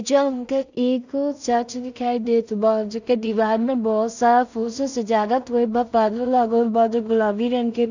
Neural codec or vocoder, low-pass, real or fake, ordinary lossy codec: codec, 16 kHz in and 24 kHz out, 0.4 kbps, LongCat-Audio-Codec, two codebook decoder; 7.2 kHz; fake; none